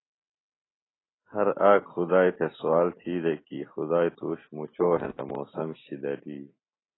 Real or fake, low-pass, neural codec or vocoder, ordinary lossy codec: real; 7.2 kHz; none; AAC, 16 kbps